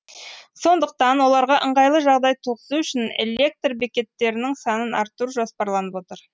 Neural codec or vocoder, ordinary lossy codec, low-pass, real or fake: none; none; none; real